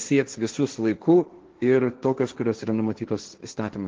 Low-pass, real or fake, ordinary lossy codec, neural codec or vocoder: 7.2 kHz; fake; Opus, 16 kbps; codec, 16 kHz, 1.1 kbps, Voila-Tokenizer